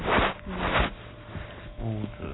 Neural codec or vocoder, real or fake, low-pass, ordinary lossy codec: none; real; 7.2 kHz; AAC, 16 kbps